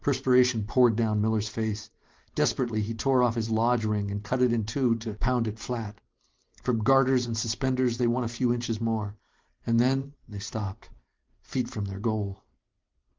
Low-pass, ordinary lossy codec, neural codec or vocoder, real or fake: 7.2 kHz; Opus, 32 kbps; vocoder, 44.1 kHz, 128 mel bands every 512 samples, BigVGAN v2; fake